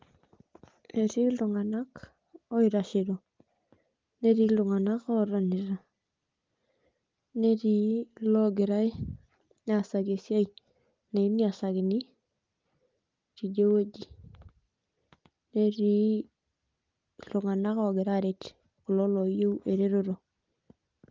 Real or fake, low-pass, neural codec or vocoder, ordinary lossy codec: real; 7.2 kHz; none; Opus, 32 kbps